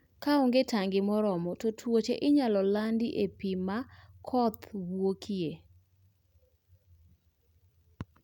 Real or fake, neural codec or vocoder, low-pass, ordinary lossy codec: real; none; 19.8 kHz; none